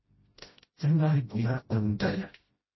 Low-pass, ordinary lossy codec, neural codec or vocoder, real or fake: 7.2 kHz; MP3, 24 kbps; codec, 16 kHz, 0.5 kbps, FreqCodec, smaller model; fake